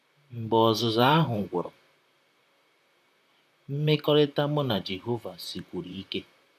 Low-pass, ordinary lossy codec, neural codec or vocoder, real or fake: 14.4 kHz; none; vocoder, 44.1 kHz, 128 mel bands, Pupu-Vocoder; fake